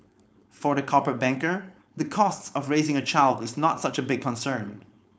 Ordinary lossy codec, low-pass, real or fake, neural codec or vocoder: none; none; fake; codec, 16 kHz, 4.8 kbps, FACodec